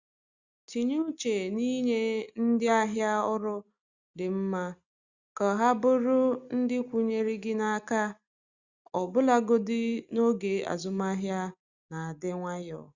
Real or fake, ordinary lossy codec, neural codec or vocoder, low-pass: real; Opus, 64 kbps; none; 7.2 kHz